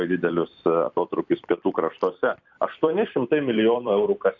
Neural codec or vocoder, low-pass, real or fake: vocoder, 44.1 kHz, 128 mel bands every 256 samples, BigVGAN v2; 7.2 kHz; fake